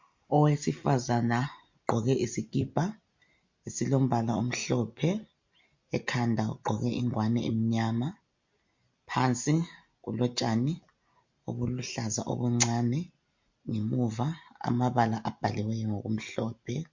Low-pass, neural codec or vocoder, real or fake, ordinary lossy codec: 7.2 kHz; none; real; MP3, 48 kbps